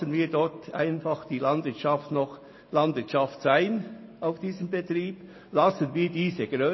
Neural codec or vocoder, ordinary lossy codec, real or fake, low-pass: none; MP3, 24 kbps; real; 7.2 kHz